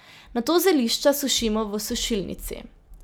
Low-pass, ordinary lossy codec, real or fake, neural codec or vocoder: none; none; real; none